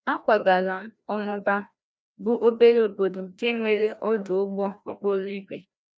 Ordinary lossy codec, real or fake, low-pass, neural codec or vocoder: none; fake; none; codec, 16 kHz, 1 kbps, FreqCodec, larger model